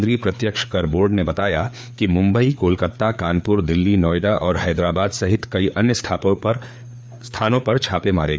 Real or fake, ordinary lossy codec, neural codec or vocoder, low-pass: fake; none; codec, 16 kHz, 4 kbps, FreqCodec, larger model; none